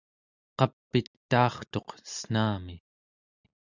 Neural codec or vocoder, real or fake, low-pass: none; real; 7.2 kHz